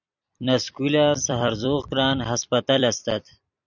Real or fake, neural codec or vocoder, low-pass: fake; vocoder, 44.1 kHz, 128 mel bands every 256 samples, BigVGAN v2; 7.2 kHz